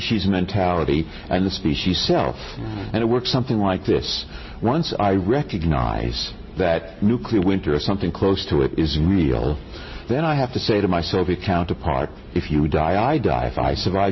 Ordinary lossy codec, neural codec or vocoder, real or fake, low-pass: MP3, 24 kbps; none; real; 7.2 kHz